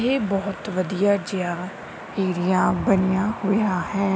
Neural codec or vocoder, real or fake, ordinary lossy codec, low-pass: none; real; none; none